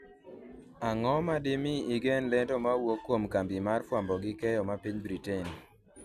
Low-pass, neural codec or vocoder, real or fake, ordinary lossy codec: 14.4 kHz; none; real; none